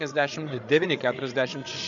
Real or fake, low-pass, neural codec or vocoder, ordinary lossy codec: fake; 7.2 kHz; codec, 16 kHz, 8 kbps, FreqCodec, larger model; MP3, 64 kbps